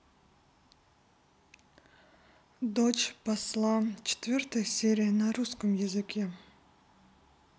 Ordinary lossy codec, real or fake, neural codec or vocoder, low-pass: none; real; none; none